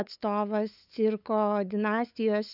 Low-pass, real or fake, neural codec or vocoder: 5.4 kHz; fake; codec, 16 kHz, 8 kbps, FunCodec, trained on LibriTTS, 25 frames a second